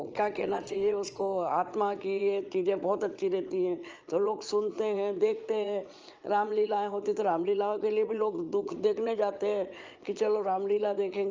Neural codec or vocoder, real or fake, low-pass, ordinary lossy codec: vocoder, 22.05 kHz, 80 mel bands, Vocos; fake; 7.2 kHz; Opus, 24 kbps